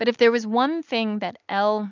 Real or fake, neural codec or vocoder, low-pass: real; none; 7.2 kHz